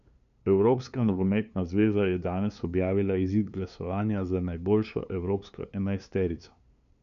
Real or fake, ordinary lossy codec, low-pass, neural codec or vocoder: fake; none; 7.2 kHz; codec, 16 kHz, 2 kbps, FunCodec, trained on LibriTTS, 25 frames a second